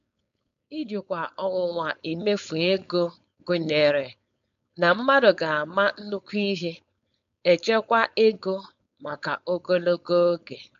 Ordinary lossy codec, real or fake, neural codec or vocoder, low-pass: none; fake; codec, 16 kHz, 4.8 kbps, FACodec; 7.2 kHz